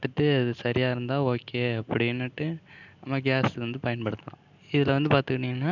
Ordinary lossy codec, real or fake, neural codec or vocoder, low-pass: none; real; none; 7.2 kHz